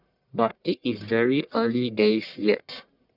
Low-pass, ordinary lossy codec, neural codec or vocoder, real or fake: 5.4 kHz; none; codec, 44.1 kHz, 1.7 kbps, Pupu-Codec; fake